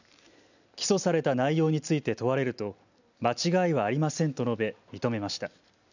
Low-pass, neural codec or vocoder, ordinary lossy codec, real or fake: 7.2 kHz; none; none; real